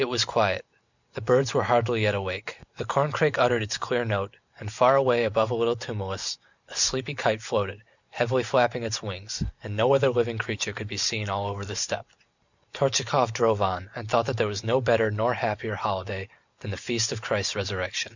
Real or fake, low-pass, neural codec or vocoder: real; 7.2 kHz; none